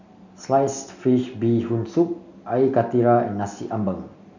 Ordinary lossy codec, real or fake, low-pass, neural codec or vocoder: none; real; 7.2 kHz; none